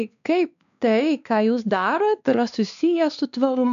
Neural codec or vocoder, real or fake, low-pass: codec, 16 kHz, 2 kbps, X-Codec, WavLM features, trained on Multilingual LibriSpeech; fake; 7.2 kHz